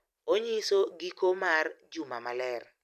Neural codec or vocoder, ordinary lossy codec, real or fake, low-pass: vocoder, 44.1 kHz, 128 mel bands every 256 samples, BigVGAN v2; none; fake; 14.4 kHz